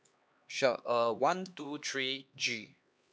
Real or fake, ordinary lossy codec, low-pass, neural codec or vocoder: fake; none; none; codec, 16 kHz, 2 kbps, X-Codec, HuBERT features, trained on LibriSpeech